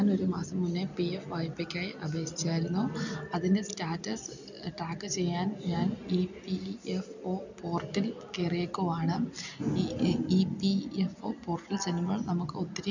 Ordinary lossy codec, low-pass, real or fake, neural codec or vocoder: none; 7.2 kHz; real; none